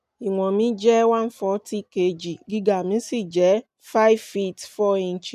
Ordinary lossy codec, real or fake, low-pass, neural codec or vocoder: none; real; 14.4 kHz; none